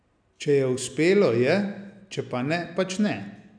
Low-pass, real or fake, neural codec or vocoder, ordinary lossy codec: 9.9 kHz; real; none; none